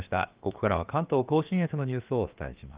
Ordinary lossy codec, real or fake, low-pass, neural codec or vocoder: Opus, 32 kbps; fake; 3.6 kHz; codec, 16 kHz, about 1 kbps, DyCAST, with the encoder's durations